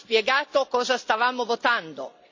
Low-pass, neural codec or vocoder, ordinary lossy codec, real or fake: 7.2 kHz; none; MP3, 64 kbps; real